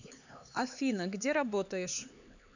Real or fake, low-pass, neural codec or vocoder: fake; 7.2 kHz; codec, 16 kHz, 4 kbps, X-Codec, HuBERT features, trained on LibriSpeech